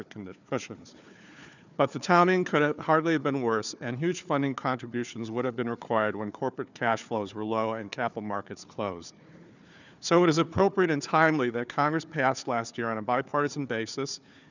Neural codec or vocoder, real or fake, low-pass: codec, 16 kHz, 4 kbps, FunCodec, trained on Chinese and English, 50 frames a second; fake; 7.2 kHz